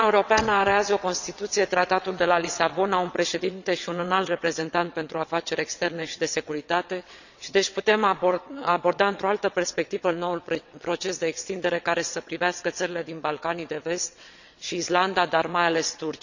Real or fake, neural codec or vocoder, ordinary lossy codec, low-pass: fake; vocoder, 22.05 kHz, 80 mel bands, WaveNeXt; none; 7.2 kHz